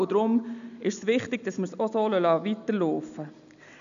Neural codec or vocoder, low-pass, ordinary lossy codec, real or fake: none; 7.2 kHz; none; real